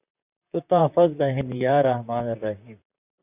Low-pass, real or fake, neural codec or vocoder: 3.6 kHz; fake; vocoder, 44.1 kHz, 128 mel bands, Pupu-Vocoder